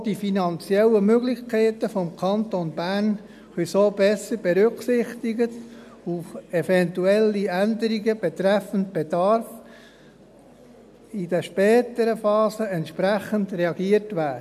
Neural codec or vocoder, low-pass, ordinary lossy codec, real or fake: none; 14.4 kHz; none; real